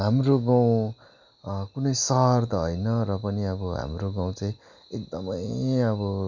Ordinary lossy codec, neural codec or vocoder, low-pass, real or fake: none; none; 7.2 kHz; real